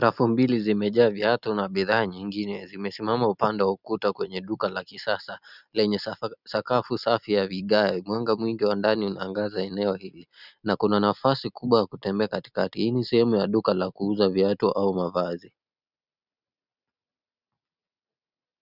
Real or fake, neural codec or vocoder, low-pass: real; none; 5.4 kHz